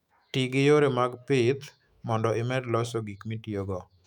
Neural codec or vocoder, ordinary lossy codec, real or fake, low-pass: autoencoder, 48 kHz, 128 numbers a frame, DAC-VAE, trained on Japanese speech; none; fake; 19.8 kHz